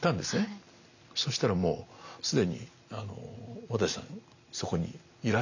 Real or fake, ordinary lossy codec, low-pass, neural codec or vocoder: real; none; 7.2 kHz; none